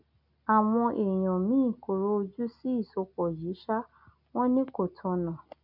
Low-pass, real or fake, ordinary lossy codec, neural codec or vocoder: 5.4 kHz; real; none; none